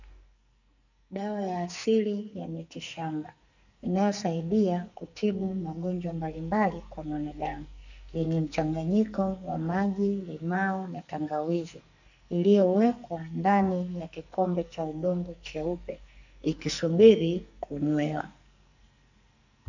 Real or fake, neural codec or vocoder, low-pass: fake; codec, 44.1 kHz, 2.6 kbps, SNAC; 7.2 kHz